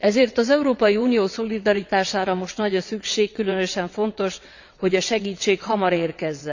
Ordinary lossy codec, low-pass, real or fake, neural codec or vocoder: none; 7.2 kHz; fake; vocoder, 22.05 kHz, 80 mel bands, WaveNeXt